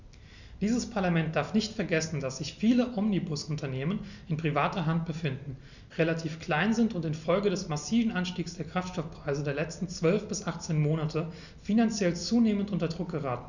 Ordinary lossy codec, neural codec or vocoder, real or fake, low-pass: none; none; real; 7.2 kHz